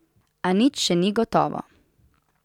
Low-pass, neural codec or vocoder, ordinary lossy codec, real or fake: 19.8 kHz; none; none; real